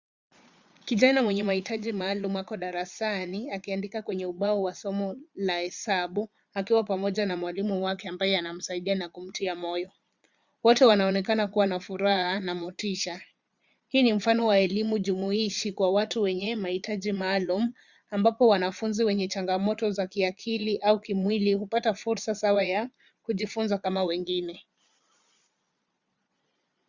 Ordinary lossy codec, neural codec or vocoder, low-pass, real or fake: Opus, 64 kbps; vocoder, 22.05 kHz, 80 mel bands, Vocos; 7.2 kHz; fake